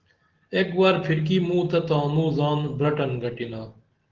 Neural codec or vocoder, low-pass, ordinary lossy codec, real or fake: none; 7.2 kHz; Opus, 16 kbps; real